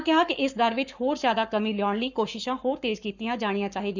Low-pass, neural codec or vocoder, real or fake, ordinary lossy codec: 7.2 kHz; codec, 44.1 kHz, 7.8 kbps, Pupu-Codec; fake; none